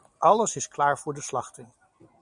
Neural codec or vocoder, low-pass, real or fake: none; 10.8 kHz; real